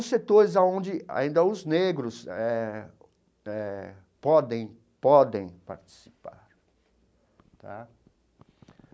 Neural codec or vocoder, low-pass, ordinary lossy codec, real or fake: none; none; none; real